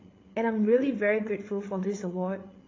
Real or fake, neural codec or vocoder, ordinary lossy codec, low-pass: fake; codec, 16 kHz, 8 kbps, FreqCodec, larger model; AAC, 32 kbps; 7.2 kHz